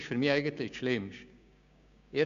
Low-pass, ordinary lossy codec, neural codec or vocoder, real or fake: 7.2 kHz; none; codec, 16 kHz, 6 kbps, DAC; fake